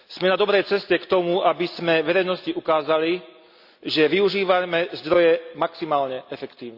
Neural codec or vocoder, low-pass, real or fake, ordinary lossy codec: none; 5.4 kHz; real; Opus, 64 kbps